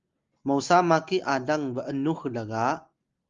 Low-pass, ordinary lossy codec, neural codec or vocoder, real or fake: 7.2 kHz; Opus, 32 kbps; none; real